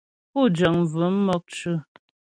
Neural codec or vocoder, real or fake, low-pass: none; real; 9.9 kHz